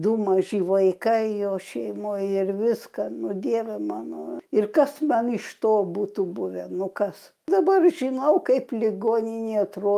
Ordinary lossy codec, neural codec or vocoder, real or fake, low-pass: Opus, 32 kbps; autoencoder, 48 kHz, 128 numbers a frame, DAC-VAE, trained on Japanese speech; fake; 14.4 kHz